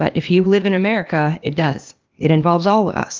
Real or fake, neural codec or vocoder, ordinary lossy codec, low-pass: fake; codec, 16 kHz, 0.8 kbps, ZipCodec; Opus, 32 kbps; 7.2 kHz